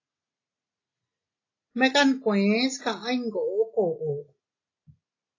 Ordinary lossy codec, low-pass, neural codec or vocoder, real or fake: AAC, 32 kbps; 7.2 kHz; none; real